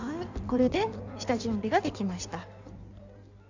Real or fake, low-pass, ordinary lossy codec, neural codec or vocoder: fake; 7.2 kHz; none; codec, 16 kHz in and 24 kHz out, 1.1 kbps, FireRedTTS-2 codec